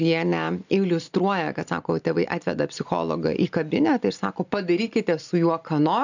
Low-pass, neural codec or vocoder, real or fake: 7.2 kHz; none; real